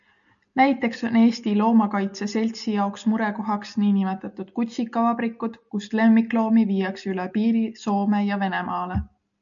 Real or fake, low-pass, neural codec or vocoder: real; 7.2 kHz; none